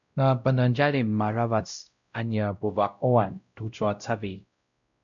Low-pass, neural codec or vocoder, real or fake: 7.2 kHz; codec, 16 kHz, 0.5 kbps, X-Codec, WavLM features, trained on Multilingual LibriSpeech; fake